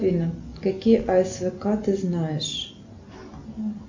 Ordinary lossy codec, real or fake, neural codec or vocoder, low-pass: MP3, 64 kbps; real; none; 7.2 kHz